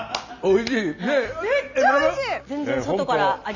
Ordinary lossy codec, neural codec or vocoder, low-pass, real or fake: none; none; 7.2 kHz; real